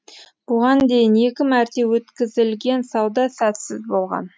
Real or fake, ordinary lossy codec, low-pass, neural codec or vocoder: real; none; none; none